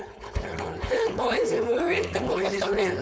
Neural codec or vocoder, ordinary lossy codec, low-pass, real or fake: codec, 16 kHz, 4.8 kbps, FACodec; none; none; fake